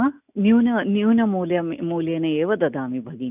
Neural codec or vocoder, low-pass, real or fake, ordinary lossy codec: none; 3.6 kHz; real; none